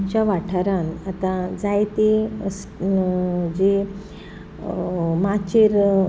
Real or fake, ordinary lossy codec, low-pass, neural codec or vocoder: real; none; none; none